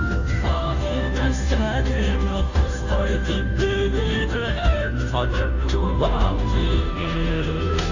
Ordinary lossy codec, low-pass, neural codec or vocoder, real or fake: AAC, 48 kbps; 7.2 kHz; codec, 16 kHz, 0.5 kbps, FunCodec, trained on Chinese and English, 25 frames a second; fake